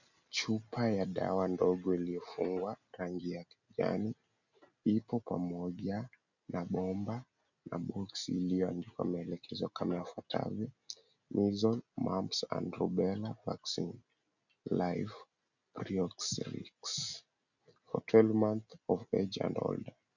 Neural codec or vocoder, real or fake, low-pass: none; real; 7.2 kHz